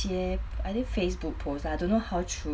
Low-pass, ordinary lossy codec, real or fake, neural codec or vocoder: none; none; real; none